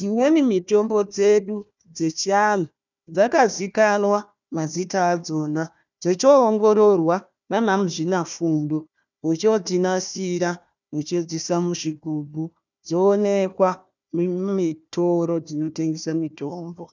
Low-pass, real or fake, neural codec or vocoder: 7.2 kHz; fake; codec, 16 kHz, 1 kbps, FunCodec, trained on Chinese and English, 50 frames a second